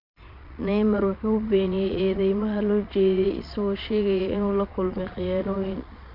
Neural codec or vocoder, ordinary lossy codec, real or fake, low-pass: vocoder, 22.05 kHz, 80 mel bands, Vocos; MP3, 48 kbps; fake; 5.4 kHz